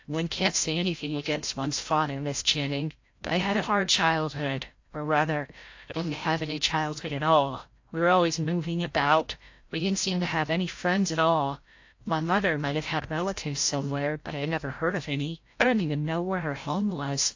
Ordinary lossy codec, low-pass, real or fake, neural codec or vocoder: AAC, 48 kbps; 7.2 kHz; fake; codec, 16 kHz, 0.5 kbps, FreqCodec, larger model